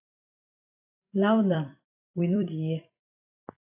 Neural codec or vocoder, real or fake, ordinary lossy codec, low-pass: vocoder, 44.1 kHz, 128 mel bands every 256 samples, BigVGAN v2; fake; AAC, 32 kbps; 3.6 kHz